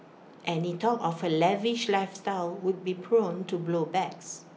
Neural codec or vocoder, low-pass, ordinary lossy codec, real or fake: none; none; none; real